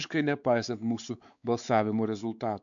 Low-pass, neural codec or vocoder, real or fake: 7.2 kHz; codec, 16 kHz, 4 kbps, X-Codec, WavLM features, trained on Multilingual LibriSpeech; fake